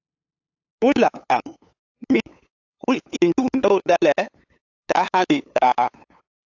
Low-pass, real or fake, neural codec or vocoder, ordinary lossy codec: 7.2 kHz; fake; codec, 16 kHz, 8 kbps, FunCodec, trained on LibriTTS, 25 frames a second; AAC, 48 kbps